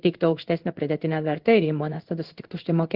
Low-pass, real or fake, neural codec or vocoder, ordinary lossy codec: 5.4 kHz; fake; codec, 16 kHz in and 24 kHz out, 1 kbps, XY-Tokenizer; Opus, 16 kbps